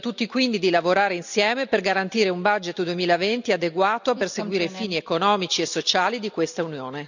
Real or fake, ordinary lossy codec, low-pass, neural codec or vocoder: real; none; 7.2 kHz; none